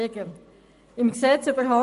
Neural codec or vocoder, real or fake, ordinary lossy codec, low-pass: none; real; MP3, 48 kbps; 14.4 kHz